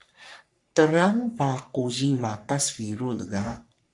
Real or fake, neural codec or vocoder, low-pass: fake; codec, 44.1 kHz, 3.4 kbps, Pupu-Codec; 10.8 kHz